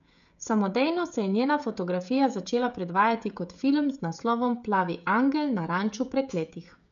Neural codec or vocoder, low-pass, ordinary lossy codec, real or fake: codec, 16 kHz, 16 kbps, FreqCodec, smaller model; 7.2 kHz; MP3, 96 kbps; fake